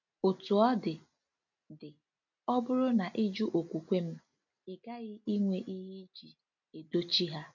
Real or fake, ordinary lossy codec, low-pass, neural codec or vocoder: real; none; 7.2 kHz; none